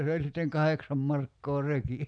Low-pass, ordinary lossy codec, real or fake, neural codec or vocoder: 9.9 kHz; none; real; none